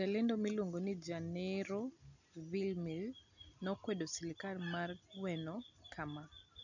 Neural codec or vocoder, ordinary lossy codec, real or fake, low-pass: none; none; real; 7.2 kHz